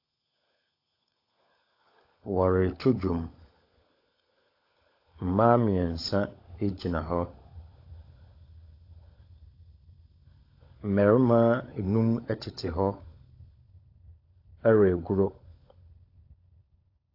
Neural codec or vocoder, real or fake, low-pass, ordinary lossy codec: codec, 16 kHz, 16 kbps, FunCodec, trained on LibriTTS, 50 frames a second; fake; 5.4 kHz; AAC, 32 kbps